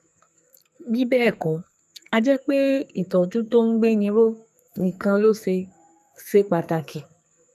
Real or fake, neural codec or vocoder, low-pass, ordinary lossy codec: fake; codec, 44.1 kHz, 2.6 kbps, SNAC; 14.4 kHz; none